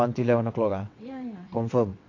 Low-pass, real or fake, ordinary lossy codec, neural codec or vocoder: 7.2 kHz; fake; AAC, 48 kbps; vocoder, 22.05 kHz, 80 mel bands, WaveNeXt